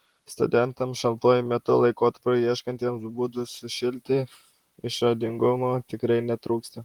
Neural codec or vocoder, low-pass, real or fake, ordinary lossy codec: vocoder, 44.1 kHz, 128 mel bands, Pupu-Vocoder; 19.8 kHz; fake; Opus, 24 kbps